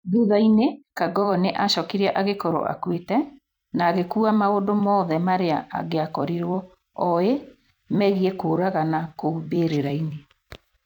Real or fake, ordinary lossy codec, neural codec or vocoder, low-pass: fake; none; vocoder, 44.1 kHz, 128 mel bands every 256 samples, BigVGAN v2; 19.8 kHz